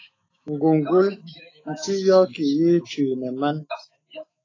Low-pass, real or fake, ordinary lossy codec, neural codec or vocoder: 7.2 kHz; fake; AAC, 32 kbps; autoencoder, 48 kHz, 128 numbers a frame, DAC-VAE, trained on Japanese speech